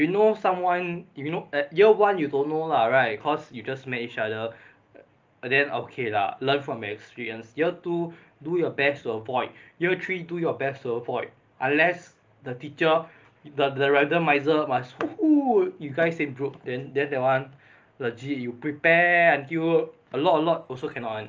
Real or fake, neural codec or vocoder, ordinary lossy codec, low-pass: real; none; Opus, 24 kbps; 7.2 kHz